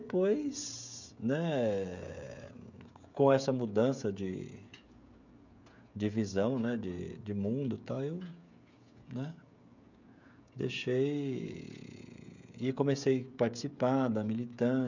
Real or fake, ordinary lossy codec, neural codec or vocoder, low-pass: fake; none; codec, 16 kHz, 16 kbps, FreqCodec, smaller model; 7.2 kHz